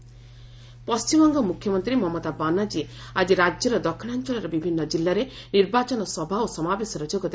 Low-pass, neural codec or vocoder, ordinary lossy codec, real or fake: none; none; none; real